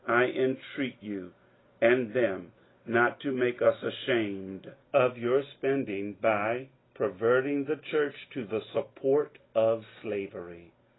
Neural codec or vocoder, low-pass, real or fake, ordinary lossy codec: none; 7.2 kHz; real; AAC, 16 kbps